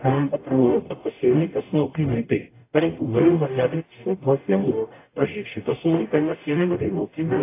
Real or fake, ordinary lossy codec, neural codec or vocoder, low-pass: fake; AAC, 24 kbps; codec, 44.1 kHz, 0.9 kbps, DAC; 3.6 kHz